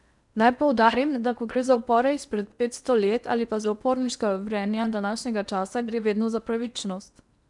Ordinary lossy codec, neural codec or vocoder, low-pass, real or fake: none; codec, 16 kHz in and 24 kHz out, 0.8 kbps, FocalCodec, streaming, 65536 codes; 10.8 kHz; fake